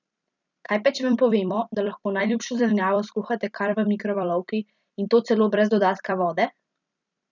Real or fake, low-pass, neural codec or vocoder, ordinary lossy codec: fake; 7.2 kHz; vocoder, 44.1 kHz, 128 mel bands every 256 samples, BigVGAN v2; none